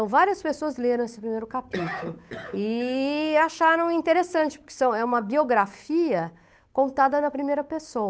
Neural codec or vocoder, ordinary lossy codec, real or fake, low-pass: codec, 16 kHz, 8 kbps, FunCodec, trained on Chinese and English, 25 frames a second; none; fake; none